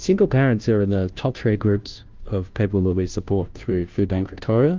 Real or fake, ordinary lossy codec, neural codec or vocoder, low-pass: fake; Opus, 24 kbps; codec, 16 kHz, 0.5 kbps, FunCodec, trained on Chinese and English, 25 frames a second; 7.2 kHz